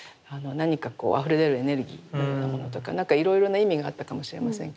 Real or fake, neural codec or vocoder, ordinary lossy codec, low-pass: real; none; none; none